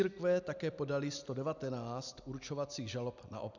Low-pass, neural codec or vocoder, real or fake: 7.2 kHz; none; real